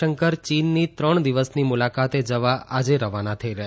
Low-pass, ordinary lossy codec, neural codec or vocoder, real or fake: none; none; none; real